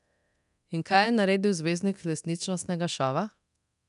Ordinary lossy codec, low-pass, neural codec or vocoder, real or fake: none; 10.8 kHz; codec, 24 kHz, 0.9 kbps, DualCodec; fake